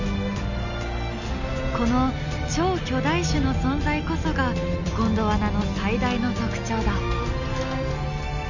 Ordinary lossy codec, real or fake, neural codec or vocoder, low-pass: none; real; none; 7.2 kHz